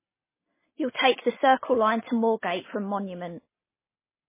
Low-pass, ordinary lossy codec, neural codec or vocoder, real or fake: 3.6 kHz; MP3, 16 kbps; none; real